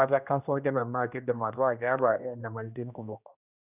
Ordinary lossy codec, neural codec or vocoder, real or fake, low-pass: none; codec, 16 kHz, 1 kbps, X-Codec, HuBERT features, trained on general audio; fake; 3.6 kHz